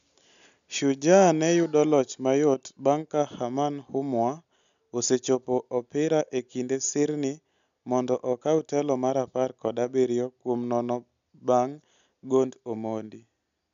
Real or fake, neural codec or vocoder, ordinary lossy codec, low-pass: real; none; none; 7.2 kHz